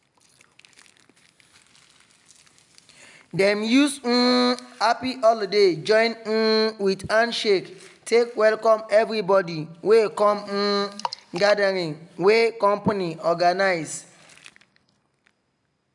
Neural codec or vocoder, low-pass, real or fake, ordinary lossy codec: none; 10.8 kHz; real; none